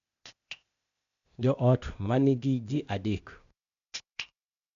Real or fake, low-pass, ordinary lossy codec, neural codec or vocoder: fake; 7.2 kHz; AAC, 96 kbps; codec, 16 kHz, 0.8 kbps, ZipCodec